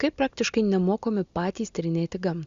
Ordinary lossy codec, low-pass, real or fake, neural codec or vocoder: Opus, 64 kbps; 7.2 kHz; real; none